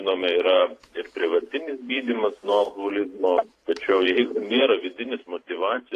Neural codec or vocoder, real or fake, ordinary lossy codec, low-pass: vocoder, 44.1 kHz, 128 mel bands every 512 samples, BigVGAN v2; fake; AAC, 48 kbps; 14.4 kHz